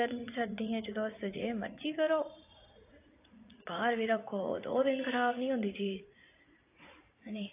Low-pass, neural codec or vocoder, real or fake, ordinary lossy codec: 3.6 kHz; vocoder, 44.1 kHz, 80 mel bands, Vocos; fake; AAC, 24 kbps